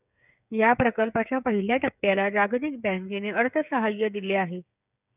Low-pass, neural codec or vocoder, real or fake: 3.6 kHz; codec, 16 kHz, 8 kbps, FreqCodec, smaller model; fake